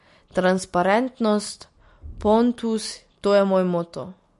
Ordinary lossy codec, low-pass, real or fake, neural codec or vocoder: MP3, 48 kbps; 14.4 kHz; real; none